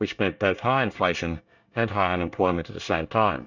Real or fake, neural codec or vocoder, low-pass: fake; codec, 24 kHz, 1 kbps, SNAC; 7.2 kHz